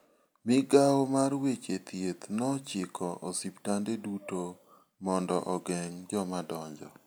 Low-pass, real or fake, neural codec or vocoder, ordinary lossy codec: none; real; none; none